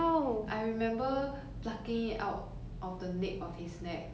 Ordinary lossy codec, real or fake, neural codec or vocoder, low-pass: none; real; none; none